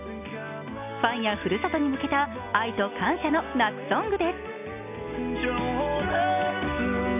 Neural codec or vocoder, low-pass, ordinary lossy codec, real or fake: none; 3.6 kHz; none; real